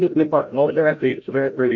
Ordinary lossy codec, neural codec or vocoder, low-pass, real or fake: AAC, 48 kbps; codec, 16 kHz, 0.5 kbps, FreqCodec, larger model; 7.2 kHz; fake